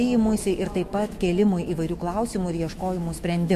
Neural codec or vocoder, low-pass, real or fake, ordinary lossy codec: autoencoder, 48 kHz, 128 numbers a frame, DAC-VAE, trained on Japanese speech; 14.4 kHz; fake; MP3, 64 kbps